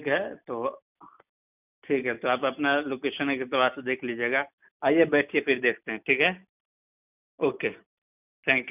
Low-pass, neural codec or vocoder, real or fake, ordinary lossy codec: 3.6 kHz; none; real; none